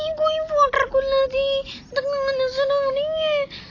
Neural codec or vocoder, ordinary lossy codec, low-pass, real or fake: none; AAC, 32 kbps; 7.2 kHz; real